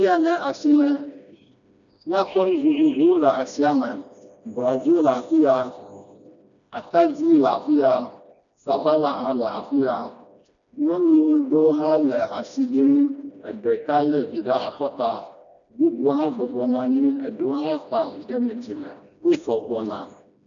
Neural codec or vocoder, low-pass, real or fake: codec, 16 kHz, 1 kbps, FreqCodec, smaller model; 7.2 kHz; fake